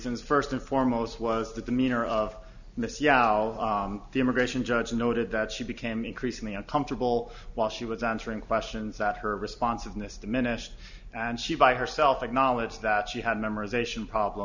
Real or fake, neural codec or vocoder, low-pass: real; none; 7.2 kHz